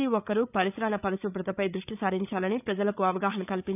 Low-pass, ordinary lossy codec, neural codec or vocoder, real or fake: 3.6 kHz; none; codec, 16 kHz, 8 kbps, FreqCodec, larger model; fake